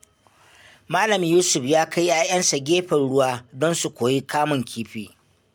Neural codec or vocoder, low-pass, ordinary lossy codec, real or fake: none; none; none; real